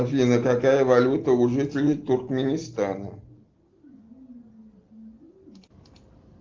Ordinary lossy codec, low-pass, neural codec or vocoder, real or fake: Opus, 32 kbps; 7.2 kHz; none; real